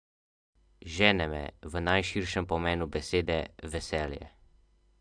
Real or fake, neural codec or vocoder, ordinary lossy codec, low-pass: real; none; AAC, 64 kbps; 9.9 kHz